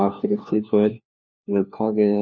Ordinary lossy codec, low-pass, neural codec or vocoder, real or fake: none; none; codec, 16 kHz, 1 kbps, FunCodec, trained on LibriTTS, 50 frames a second; fake